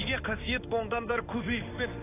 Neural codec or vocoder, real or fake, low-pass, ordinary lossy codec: codec, 16 kHz in and 24 kHz out, 1 kbps, XY-Tokenizer; fake; 3.6 kHz; AAC, 16 kbps